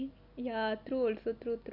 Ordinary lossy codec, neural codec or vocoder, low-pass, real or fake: Opus, 64 kbps; none; 5.4 kHz; real